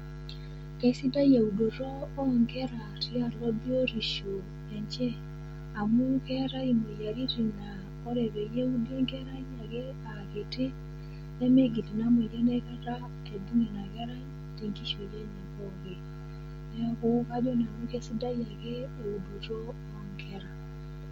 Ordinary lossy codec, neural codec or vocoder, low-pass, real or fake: MP3, 64 kbps; none; 19.8 kHz; real